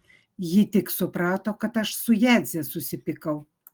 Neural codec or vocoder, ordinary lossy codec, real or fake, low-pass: none; Opus, 24 kbps; real; 19.8 kHz